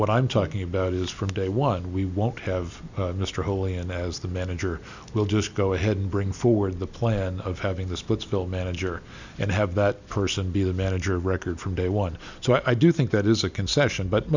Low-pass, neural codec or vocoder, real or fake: 7.2 kHz; none; real